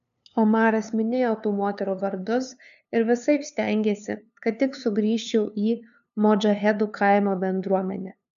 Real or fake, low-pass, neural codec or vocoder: fake; 7.2 kHz; codec, 16 kHz, 2 kbps, FunCodec, trained on LibriTTS, 25 frames a second